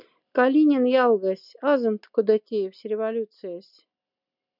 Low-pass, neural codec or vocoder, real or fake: 5.4 kHz; none; real